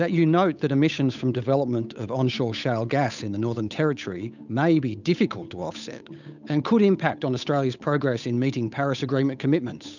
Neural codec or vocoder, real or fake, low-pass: codec, 16 kHz, 8 kbps, FunCodec, trained on Chinese and English, 25 frames a second; fake; 7.2 kHz